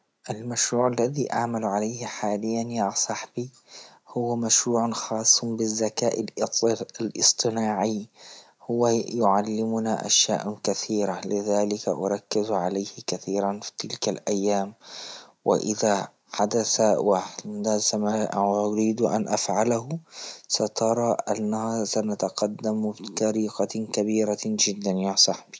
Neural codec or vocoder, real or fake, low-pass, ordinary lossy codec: none; real; none; none